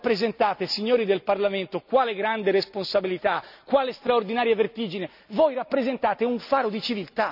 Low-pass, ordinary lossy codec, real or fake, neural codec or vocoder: 5.4 kHz; none; real; none